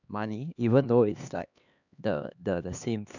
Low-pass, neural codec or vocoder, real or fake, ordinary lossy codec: 7.2 kHz; codec, 16 kHz, 2 kbps, X-Codec, HuBERT features, trained on LibriSpeech; fake; none